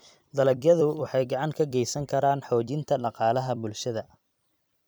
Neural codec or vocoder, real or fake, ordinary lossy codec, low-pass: vocoder, 44.1 kHz, 128 mel bands every 256 samples, BigVGAN v2; fake; none; none